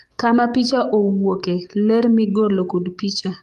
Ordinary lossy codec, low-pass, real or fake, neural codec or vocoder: Opus, 32 kbps; 14.4 kHz; fake; codec, 44.1 kHz, 7.8 kbps, DAC